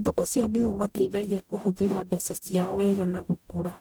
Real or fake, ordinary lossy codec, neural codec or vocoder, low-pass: fake; none; codec, 44.1 kHz, 0.9 kbps, DAC; none